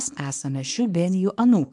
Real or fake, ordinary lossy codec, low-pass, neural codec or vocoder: fake; MP3, 64 kbps; 10.8 kHz; codec, 24 kHz, 0.9 kbps, WavTokenizer, small release